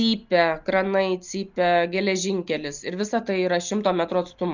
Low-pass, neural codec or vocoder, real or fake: 7.2 kHz; none; real